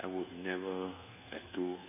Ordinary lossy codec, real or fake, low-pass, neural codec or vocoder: none; fake; 3.6 kHz; codec, 24 kHz, 1.2 kbps, DualCodec